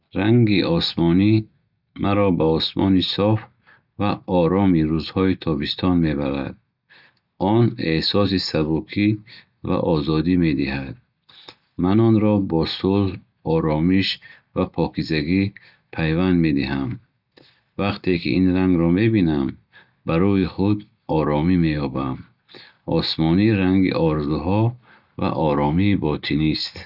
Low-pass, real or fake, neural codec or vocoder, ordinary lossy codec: 5.4 kHz; real; none; none